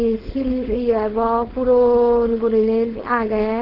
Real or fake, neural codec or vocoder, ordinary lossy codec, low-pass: fake; codec, 16 kHz, 4.8 kbps, FACodec; Opus, 16 kbps; 5.4 kHz